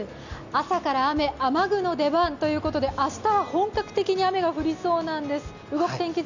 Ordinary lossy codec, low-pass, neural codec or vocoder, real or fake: none; 7.2 kHz; none; real